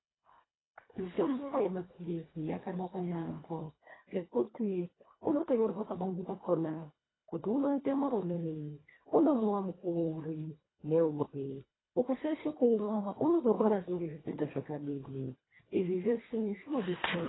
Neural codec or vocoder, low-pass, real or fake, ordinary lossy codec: codec, 24 kHz, 1.5 kbps, HILCodec; 7.2 kHz; fake; AAC, 16 kbps